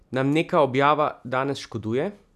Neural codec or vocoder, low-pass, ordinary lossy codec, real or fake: none; 14.4 kHz; none; real